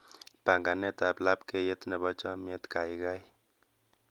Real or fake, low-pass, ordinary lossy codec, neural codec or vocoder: real; 19.8 kHz; Opus, 32 kbps; none